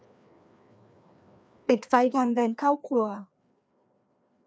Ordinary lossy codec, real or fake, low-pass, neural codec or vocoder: none; fake; none; codec, 16 kHz, 2 kbps, FreqCodec, larger model